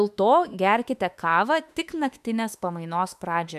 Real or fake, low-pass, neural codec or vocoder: fake; 14.4 kHz; autoencoder, 48 kHz, 32 numbers a frame, DAC-VAE, trained on Japanese speech